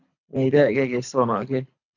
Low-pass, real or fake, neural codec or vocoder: 7.2 kHz; fake; codec, 24 kHz, 3 kbps, HILCodec